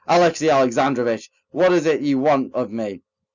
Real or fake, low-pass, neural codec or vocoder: real; 7.2 kHz; none